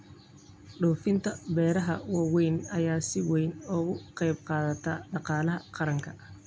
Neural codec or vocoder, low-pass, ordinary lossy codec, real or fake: none; none; none; real